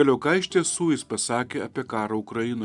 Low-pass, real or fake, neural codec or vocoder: 10.8 kHz; real; none